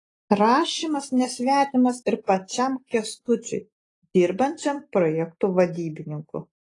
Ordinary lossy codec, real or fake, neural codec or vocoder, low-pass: AAC, 32 kbps; real; none; 10.8 kHz